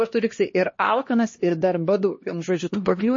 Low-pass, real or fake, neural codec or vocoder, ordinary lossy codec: 7.2 kHz; fake; codec, 16 kHz, 1 kbps, X-Codec, HuBERT features, trained on LibriSpeech; MP3, 32 kbps